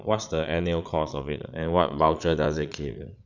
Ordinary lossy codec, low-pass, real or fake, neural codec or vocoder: none; 7.2 kHz; fake; vocoder, 22.05 kHz, 80 mel bands, Vocos